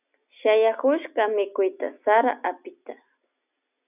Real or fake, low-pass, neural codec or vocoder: real; 3.6 kHz; none